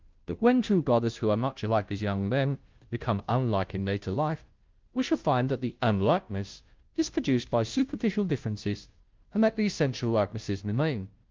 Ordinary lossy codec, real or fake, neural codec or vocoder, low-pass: Opus, 32 kbps; fake; codec, 16 kHz, 0.5 kbps, FunCodec, trained on Chinese and English, 25 frames a second; 7.2 kHz